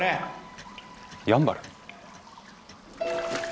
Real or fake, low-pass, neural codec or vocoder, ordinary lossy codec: real; none; none; none